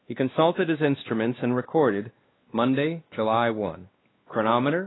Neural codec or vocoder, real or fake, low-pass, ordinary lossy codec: codec, 16 kHz, 2 kbps, X-Codec, WavLM features, trained on Multilingual LibriSpeech; fake; 7.2 kHz; AAC, 16 kbps